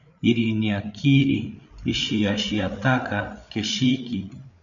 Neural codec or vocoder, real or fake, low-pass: codec, 16 kHz, 8 kbps, FreqCodec, larger model; fake; 7.2 kHz